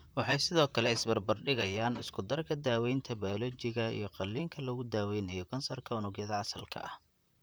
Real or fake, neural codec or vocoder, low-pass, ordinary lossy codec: fake; vocoder, 44.1 kHz, 128 mel bands, Pupu-Vocoder; none; none